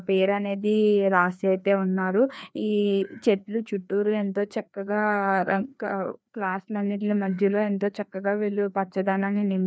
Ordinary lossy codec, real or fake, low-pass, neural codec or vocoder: none; fake; none; codec, 16 kHz, 2 kbps, FreqCodec, larger model